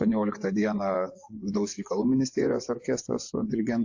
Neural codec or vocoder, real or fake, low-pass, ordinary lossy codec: vocoder, 24 kHz, 100 mel bands, Vocos; fake; 7.2 kHz; AAC, 48 kbps